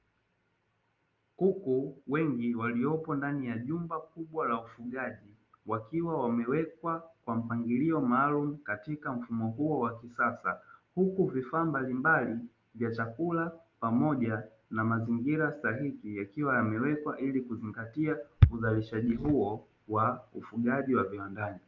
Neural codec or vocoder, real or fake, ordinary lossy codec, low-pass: none; real; Opus, 24 kbps; 7.2 kHz